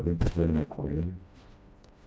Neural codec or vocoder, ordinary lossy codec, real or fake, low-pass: codec, 16 kHz, 1 kbps, FreqCodec, smaller model; none; fake; none